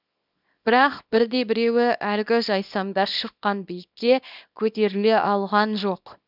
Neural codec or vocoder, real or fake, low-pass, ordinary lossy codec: codec, 24 kHz, 0.9 kbps, WavTokenizer, small release; fake; 5.4 kHz; none